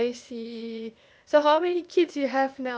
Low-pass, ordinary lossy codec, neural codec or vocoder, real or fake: none; none; codec, 16 kHz, 0.8 kbps, ZipCodec; fake